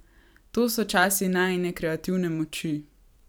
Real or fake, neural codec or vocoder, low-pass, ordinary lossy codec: fake; vocoder, 44.1 kHz, 128 mel bands every 512 samples, BigVGAN v2; none; none